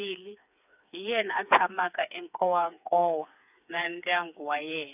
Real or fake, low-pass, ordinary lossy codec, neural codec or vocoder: fake; 3.6 kHz; none; codec, 16 kHz, 4 kbps, FreqCodec, smaller model